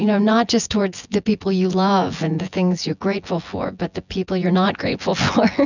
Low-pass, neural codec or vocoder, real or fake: 7.2 kHz; vocoder, 24 kHz, 100 mel bands, Vocos; fake